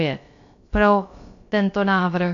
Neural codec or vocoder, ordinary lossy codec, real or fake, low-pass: codec, 16 kHz, about 1 kbps, DyCAST, with the encoder's durations; AAC, 48 kbps; fake; 7.2 kHz